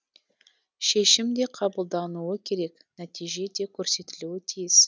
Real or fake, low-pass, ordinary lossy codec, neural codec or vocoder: real; none; none; none